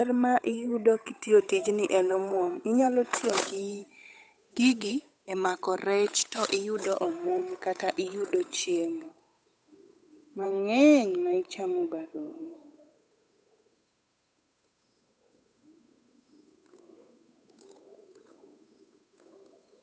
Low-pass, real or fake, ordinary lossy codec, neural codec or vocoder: none; fake; none; codec, 16 kHz, 8 kbps, FunCodec, trained on Chinese and English, 25 frames a second